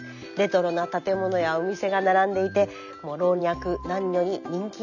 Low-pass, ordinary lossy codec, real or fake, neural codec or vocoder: 7.2 kHz; none; real; none